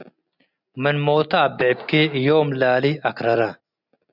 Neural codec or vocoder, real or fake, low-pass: none; real; 5.4 kHz